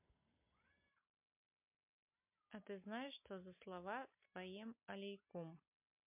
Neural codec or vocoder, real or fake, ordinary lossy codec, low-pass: none; real; MP3, 32 kbps; 3.6 kHz